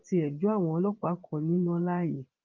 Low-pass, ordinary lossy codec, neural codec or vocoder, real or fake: 7.2 kHz; Opus, 32 kbps; vocoder, 22.05 kHz, 80 mel bands, Vocos; fake